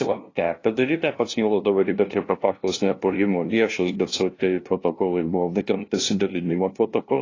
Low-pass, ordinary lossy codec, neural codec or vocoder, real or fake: 7.2 kHz; AAC, 32 kbps; codec, 16 kHz, 0.5 kbps, FunCodec, trained on LibriTTS, 25 frames a second; fake